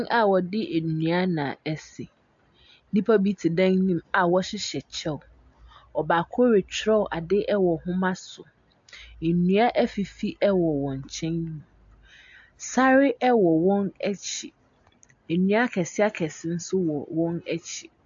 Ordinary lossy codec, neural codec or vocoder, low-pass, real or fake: AAC, 64 kbps; none; 7.2 kHz; real